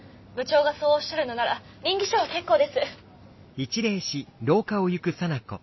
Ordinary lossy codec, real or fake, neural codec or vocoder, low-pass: MP3, 24 kbps; real; none; 7.2 kHz